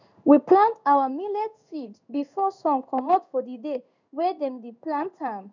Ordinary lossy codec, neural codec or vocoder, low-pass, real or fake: none; codec, 16 kHz in and 24 kHz out, 1 kbps, XY-Tokenizer; 7.2 kHz; fake